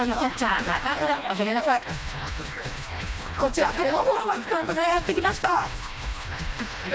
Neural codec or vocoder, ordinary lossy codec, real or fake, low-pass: codec, 16 kHz, 1 kbps, FreqCodec, smaller model; none; fake; none